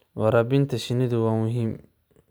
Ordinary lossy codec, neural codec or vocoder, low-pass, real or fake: none; none; none; real